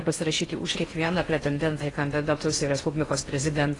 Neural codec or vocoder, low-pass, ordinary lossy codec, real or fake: codec, 16 kHz in and 24 kHz out, 0.6 kbps, FocalCodec, streaming, 4096 codes; 10.8 kHz; AAC, 32 kbps; fake